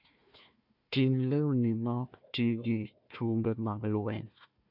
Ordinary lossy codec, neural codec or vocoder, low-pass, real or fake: MP3, 48 kbps; codec, 16 kHz, 1 kbps, FunCodec, trained on Chinese and English, 50 frames a second; 5.4 kHz; fake